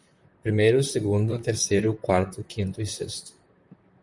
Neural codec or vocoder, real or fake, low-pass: vocoder, 44.1 kHz, 128 mel bands, Pupu-Vocoder; fake; 10.8 kHz